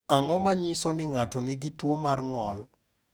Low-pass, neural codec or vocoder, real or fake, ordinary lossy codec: none; codec, 44.1 kHz, 2.6 kbps, DAC; fake; none